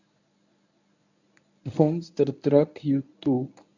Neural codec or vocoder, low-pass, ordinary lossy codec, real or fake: codec, 24 kHz, 0.9 kbps, WavTokenizer, medium speech release version 1; 7.2 kHz; none; fake